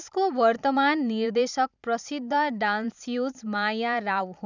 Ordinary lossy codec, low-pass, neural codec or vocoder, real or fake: none; 7.2 kHz; vocoder, 44.1 kHz, 128 mel bands every 512 samples, BigVGAN v2; fake